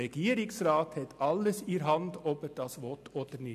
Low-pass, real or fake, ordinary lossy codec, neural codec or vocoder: 14.4 kHz; real; none; none